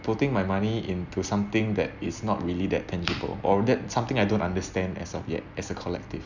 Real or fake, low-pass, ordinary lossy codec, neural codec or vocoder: real; 7.2 kHz; none; none